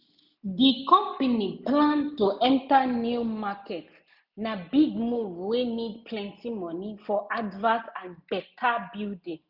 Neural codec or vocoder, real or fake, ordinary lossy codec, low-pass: none; real; none; 5.4 kHz